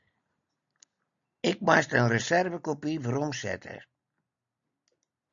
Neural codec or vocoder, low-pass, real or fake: none; 7.2 kHz; real